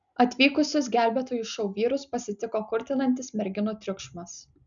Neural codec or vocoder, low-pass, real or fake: none; 7.2 kHz; real